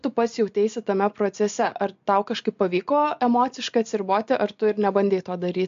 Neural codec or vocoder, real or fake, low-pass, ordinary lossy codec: none; real; 7.2 kHz; MP3, 48 kbps